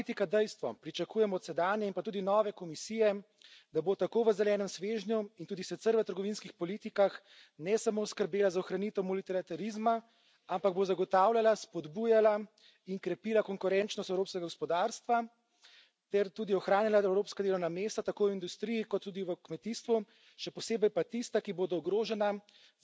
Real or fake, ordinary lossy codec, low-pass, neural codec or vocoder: real; none; none; none